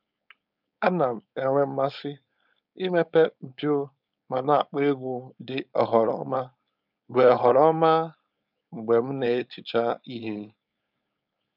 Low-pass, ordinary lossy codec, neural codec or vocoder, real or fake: 5.4 kHz; none; codec, 16 kHz, 4.8 kbps, FACodec; fake